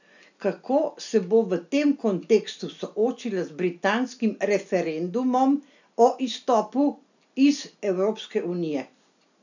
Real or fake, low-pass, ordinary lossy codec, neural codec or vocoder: real; 7.2 kHz; none; none